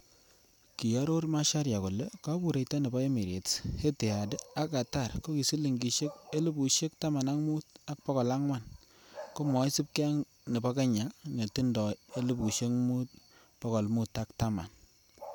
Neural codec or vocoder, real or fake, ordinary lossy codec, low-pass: none; real; none; none